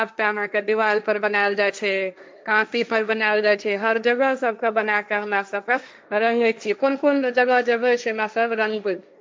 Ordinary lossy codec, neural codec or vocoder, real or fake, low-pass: none; codec, 16 kHz, 1.1 kbps, Voila-Tokenizer; fake; none